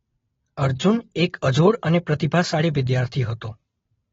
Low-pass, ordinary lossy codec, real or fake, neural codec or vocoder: 7.2 kHz; AAC, 24 kbps; real; none